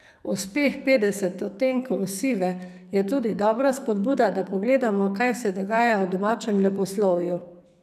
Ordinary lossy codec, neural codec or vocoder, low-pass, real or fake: none; codec, 44.1 kHz, 2.6 kbps, SNAC; 14.4 kHz; fake